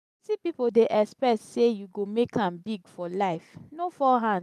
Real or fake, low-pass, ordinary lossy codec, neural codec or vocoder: real; 14.4 kHz; none; none